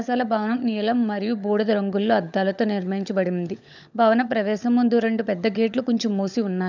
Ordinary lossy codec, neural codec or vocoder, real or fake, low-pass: none; codec, 16 kHz, 16 kbps, FunCodec, trained on LibriTTS, 50 frames a second; fake; 7.2 kHz